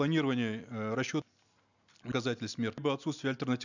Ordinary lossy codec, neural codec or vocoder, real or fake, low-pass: none; none; real; 7.2 kHz